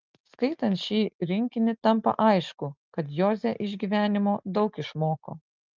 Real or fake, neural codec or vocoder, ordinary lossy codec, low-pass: real; none; Opus, 24 kbps; 7.2 kHz